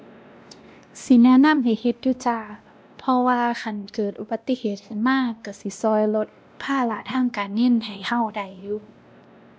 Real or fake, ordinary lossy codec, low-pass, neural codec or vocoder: fake; none; none; codec, 16 kHz, 1 kbps, X-Codec, WavLM features, trained on Multilingual LibriSpeech